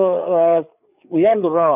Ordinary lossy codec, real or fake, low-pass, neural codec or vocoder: none; fake; 3.6 kHz; codec, 16 kHz, 4 kbps, FreqCodec, larger model